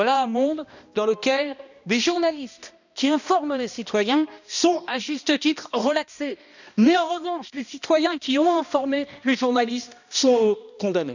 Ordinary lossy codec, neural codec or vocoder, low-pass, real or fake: none; codec, 16 kHz, 1 kbps, X-Codec, HuBERT features, trained on balanced general audio; 7.2 kHz; fake